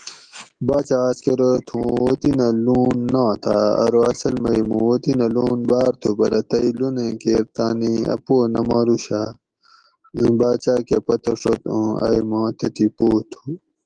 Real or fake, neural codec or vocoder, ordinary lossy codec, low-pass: fake; autoencoder, 48 kHz, 128 numbers a frame, DAC-VAE, trained on Japanese speech; Opus, 24 kbps; 9.9 kHz